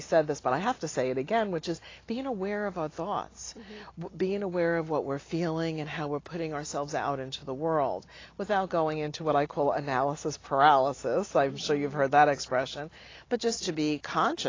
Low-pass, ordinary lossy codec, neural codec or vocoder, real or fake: 7.2 kHz; AAC, 32 kbps; none; real